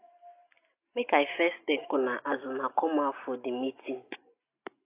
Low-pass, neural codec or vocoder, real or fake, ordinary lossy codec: 3.6 kHz; none; real; AAC, 24 kbps